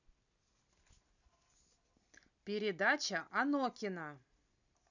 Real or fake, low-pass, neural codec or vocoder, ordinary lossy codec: real; 7.2 kHz; none; none